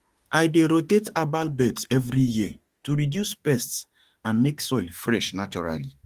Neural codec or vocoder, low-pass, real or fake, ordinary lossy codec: autoencoder, 48 kHz, 32 numbers a frame, DAC-VAE, trained on Japanese speech; 14.4 kHz; fake; Opus, 24 kbps